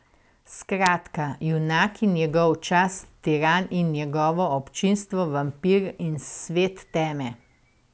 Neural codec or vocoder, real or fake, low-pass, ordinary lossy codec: none; real; none; none